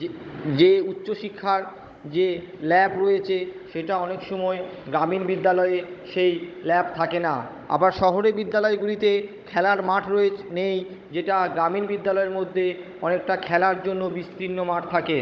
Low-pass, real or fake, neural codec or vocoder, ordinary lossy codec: none; fake; codec, 16 kHz, 16 kbps, FunCodec, trained on Chinese and English, 50 frames a second; none